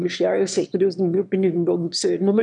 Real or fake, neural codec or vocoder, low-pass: fake; autoencoder, 22.05 kHz, a latent of 192 numbers a frame, VITS, trained on one speaker; 9.9 kHz